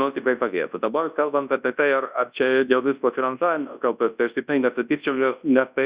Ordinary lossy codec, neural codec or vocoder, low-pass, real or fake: Opus, 64 kbps; codec, 24 kHz, 0.9 kbps, WavTokenizer, large speech release; 3.6 kHz; fake